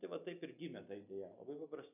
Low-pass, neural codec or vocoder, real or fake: 3.6 kHz; none; real